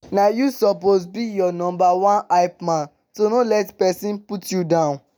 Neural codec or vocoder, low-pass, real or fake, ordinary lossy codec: none; none; real; none